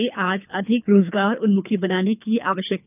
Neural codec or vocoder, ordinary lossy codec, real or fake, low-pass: codec, 24 kHz, 3 kbps, HILCodec; none; fake; 3.6 kHz